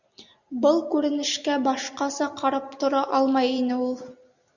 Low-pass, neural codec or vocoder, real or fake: 7.2 kHz; none; real